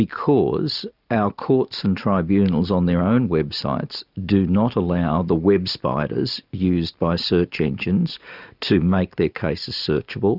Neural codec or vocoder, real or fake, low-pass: none; real; 5.4 kHz